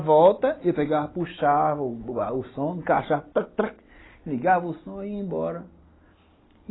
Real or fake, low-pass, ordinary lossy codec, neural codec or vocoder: real; 7.2 kHz; AAC, 16 kbps; none